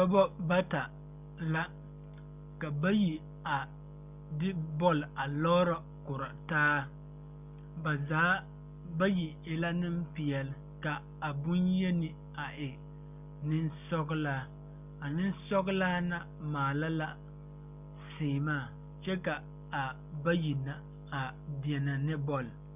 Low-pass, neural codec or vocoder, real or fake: 3.6 kHz; none; real